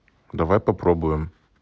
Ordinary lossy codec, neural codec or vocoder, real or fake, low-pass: none; none; real; none